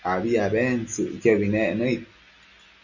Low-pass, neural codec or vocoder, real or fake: 7.2 kHz; none; real